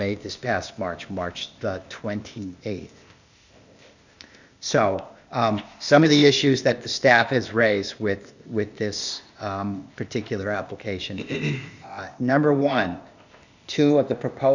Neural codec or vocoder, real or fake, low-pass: codec, 16 kHz, 0.8 kbps, ZipCodec; fake; 7.2 kHz